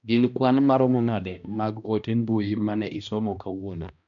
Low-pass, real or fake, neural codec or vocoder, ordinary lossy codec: 7.2 kHz; fake; codec, 16 kHz, 1 kbps, X-Codec, HuBERT features, trained on balanced general audio; none